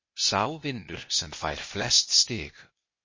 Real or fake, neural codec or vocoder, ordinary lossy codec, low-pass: fake; codec, 16 kHz, 0.8 kbps, ZipCodec; MP3, 32 kbps; 7.2 kHz